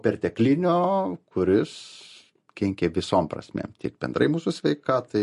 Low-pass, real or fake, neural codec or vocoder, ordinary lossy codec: 14.4 kHz; real; none; MP3, 48 kbps